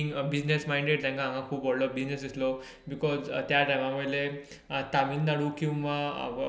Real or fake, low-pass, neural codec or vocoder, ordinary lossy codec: real; none; none; none